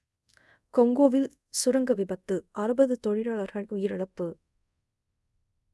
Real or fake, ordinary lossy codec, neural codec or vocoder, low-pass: fake; none; codec, 24 kHz, 0.5 kbps, DualCodec; none